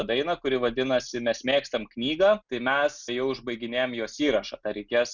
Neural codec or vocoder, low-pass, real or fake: none; 7.2 kHz; real